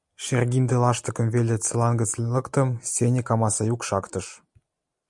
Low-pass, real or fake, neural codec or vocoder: 10.8 kHz; real; none